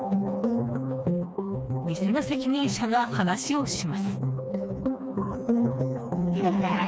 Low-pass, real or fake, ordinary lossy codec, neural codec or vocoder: none; fake; none; codec, 16 kHz, 2 kbps, FreqCodec, smaller model